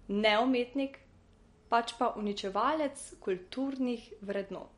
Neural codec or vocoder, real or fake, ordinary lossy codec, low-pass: none; real; MP3, 48 kbps; 10.8 kHz